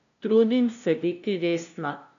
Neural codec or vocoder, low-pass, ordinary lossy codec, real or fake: codec, 16 kHz, 0.5 kbps, FunCodec, trained on LibriTTS, 25 frames a second; 7.2 kHz; AAC, 64 kbps; fake